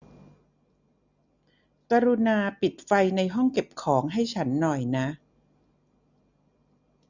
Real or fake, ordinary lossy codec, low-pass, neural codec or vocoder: real; none; 7.2 kHz; none